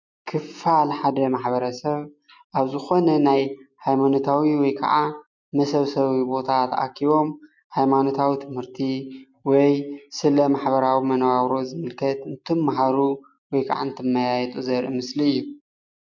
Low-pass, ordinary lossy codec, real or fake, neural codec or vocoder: 7.2 kHz; MP3, 64 kbps; real; none